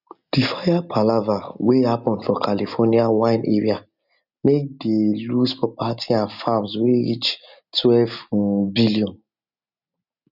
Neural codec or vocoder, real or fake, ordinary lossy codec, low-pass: none; real; none; 5.4 kHz